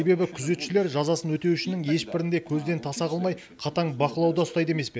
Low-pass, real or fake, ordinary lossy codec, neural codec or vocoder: none; real; none; none